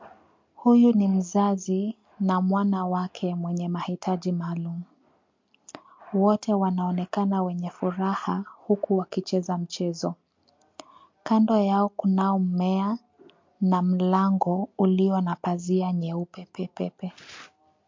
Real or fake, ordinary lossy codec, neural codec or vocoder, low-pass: real; MP3, 48 kbps; none; 7.2 kHz